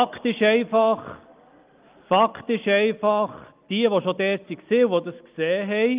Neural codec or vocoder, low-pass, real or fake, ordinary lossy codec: none; 3.6 kHz; real; Opus, 32 kbps